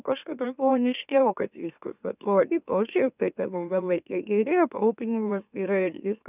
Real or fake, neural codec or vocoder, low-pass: fake; autoencoder, 44.1 kHz, a latent of 192 numbers a frame, MeloTTS; 3.6 kHz